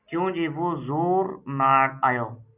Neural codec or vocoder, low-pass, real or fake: none; 3.6 kHz; real